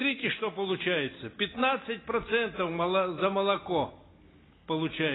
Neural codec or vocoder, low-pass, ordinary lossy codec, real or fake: none; 7.2 kHz; AAC, 16 kbps; real